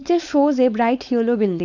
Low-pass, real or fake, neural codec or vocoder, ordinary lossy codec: 7.2 kHz; fake; codec, 16 kHz, 4.8 kbps, FACodec; none